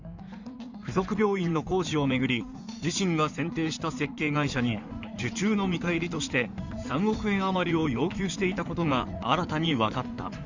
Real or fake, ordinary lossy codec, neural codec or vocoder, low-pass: fake; none; codec, 16 kHz in and 24 kHz out, 2.2 kbps, FireRedTTS-2 codec; 7.2 kHz